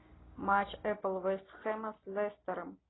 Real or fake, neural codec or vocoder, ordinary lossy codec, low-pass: real; none; AAC, 16 kbps; 7.2 kHz